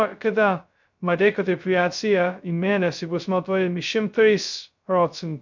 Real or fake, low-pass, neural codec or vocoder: fake; 7.2 kHz; codec, 16 kHz, 0.2 kbps, FocalCodec